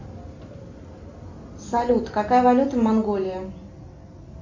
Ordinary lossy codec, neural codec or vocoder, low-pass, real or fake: MP3, 48 kbps; none; 7.2 kHz; real